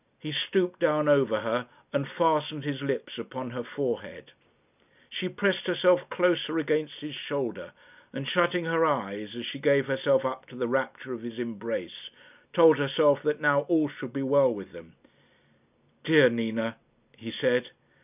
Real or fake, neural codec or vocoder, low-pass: real; none; 3.6 kHz